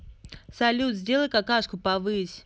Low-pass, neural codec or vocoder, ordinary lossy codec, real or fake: none; none; none; real